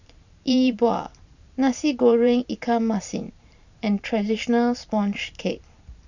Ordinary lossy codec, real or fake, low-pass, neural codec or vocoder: none; fake; 7.2 kHz; vocoder, 44.1 kHz, 128 mel bands every 512 samples, BigVGAN v2